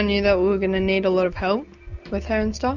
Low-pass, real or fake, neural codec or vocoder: 7.2 kHz; real; none